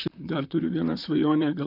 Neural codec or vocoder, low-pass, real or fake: vocoder, 44.1 kHz, 128 mel bands, Pupu-Vocoder; 5.4 kHz; fake